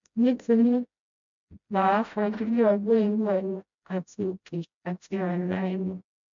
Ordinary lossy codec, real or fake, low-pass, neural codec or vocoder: MP3, 64 kbps; fake; 7.2 kHz; codec, 16 kHz, 0.5 kbps, FreqCodec, smaller model